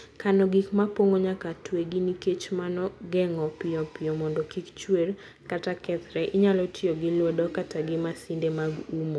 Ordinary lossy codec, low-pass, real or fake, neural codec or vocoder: none; none; real; none